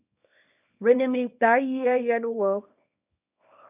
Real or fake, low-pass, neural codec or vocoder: fake; 3.6 kHz; codec, 24 kHz, 0.9 kbps, WavTokenizer, small release